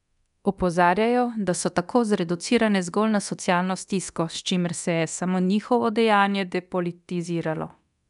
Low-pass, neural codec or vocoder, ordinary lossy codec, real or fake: 10.8 kHz; codec, 24 kHz, 0.9 kbps, DualCodec; none; fake